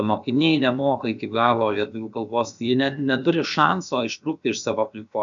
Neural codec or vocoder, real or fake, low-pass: codec, 16 kHz, about 1 kbps, DyCAST, with the encoder's durations; fake; 7.2 kHz